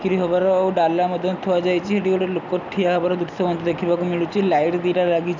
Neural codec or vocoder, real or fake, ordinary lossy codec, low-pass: none; real; none; 7.2 kHz